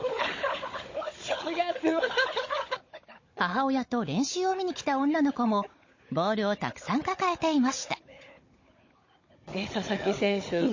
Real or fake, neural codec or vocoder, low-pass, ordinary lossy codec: fake; codec, 16 kHz, 16 kbps, FunCodec, trained on Chinese and English, 50 frames a second; 7.2 kHz; MP3, 32 kbps